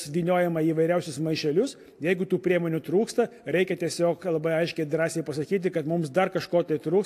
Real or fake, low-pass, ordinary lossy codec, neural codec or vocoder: real; 14.4 kHz; AAC, 64 kbps; none